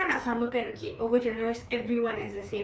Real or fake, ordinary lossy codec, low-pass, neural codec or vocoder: fake; none; none; codec, 16 kHz, 2 kbps, FreqCodec, larger model